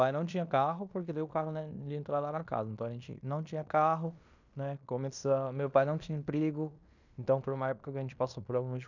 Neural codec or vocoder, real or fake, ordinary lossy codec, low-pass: codec, 16 kHz in and 24 kHz out, 0.9 kbps, LongCat-Audio-Codec, fine tuned four codebook decoder; fake; none; 7.2 kHz